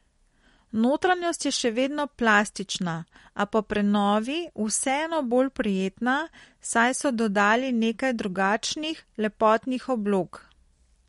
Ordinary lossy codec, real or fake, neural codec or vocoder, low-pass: MP3, 48 kbps; real; none; 19.8 kHz